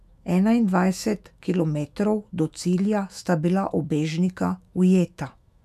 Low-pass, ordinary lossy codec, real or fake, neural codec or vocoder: 14.4 kHz; none; fake; autoencoder, 48 kHz, 128 numbers a frame, DAC-VAE, trained on Japanese speech